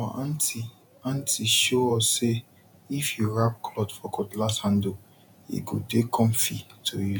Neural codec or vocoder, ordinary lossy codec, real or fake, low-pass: vocoder, 48 kHz, 128 mel bands, Vocos; none; fake; none